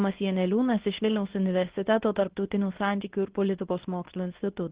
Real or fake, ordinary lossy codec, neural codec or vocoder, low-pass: fake; Opus, 16 kbps; codec, 24 kHz, 0.9 kbps, WavTokenizer, medium speech release version 2; 3.6 kHz